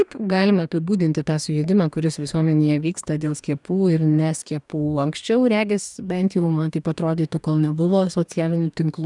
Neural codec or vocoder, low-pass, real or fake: codec, 44.1 kHz, 2.6 kbps, DAC; 10.8 kHz; fake